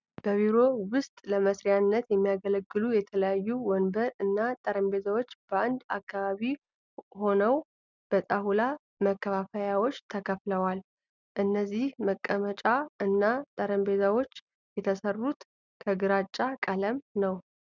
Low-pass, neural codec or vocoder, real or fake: 7.2 kHz; none; real